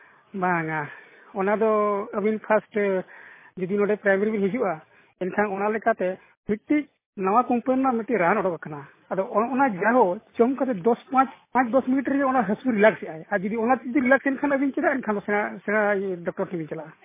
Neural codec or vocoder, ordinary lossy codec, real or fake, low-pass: none; MP3, 16 kbps; real; 3.6 kHz